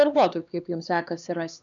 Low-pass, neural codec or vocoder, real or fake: 7.2 kHz; codec, 16 kHz, 2 kbps, X-Codec, HuBERT features, trained on LibriSpeech; fake